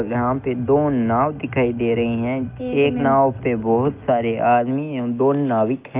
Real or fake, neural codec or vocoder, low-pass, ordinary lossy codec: real; none; 3.6 kHz; Opus, 32 kbps